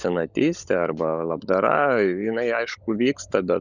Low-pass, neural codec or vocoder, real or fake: 7.2 kHz; codec, 16 kHz, 16 kbps, FunCodec, trained on LibriTTS, 50 frames a second; fake